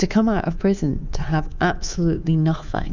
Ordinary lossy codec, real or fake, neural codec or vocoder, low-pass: Opus, 64 kbps; fake; codec, 24 kHz, 3.1 kbps, DualCodec; 7.2 kHz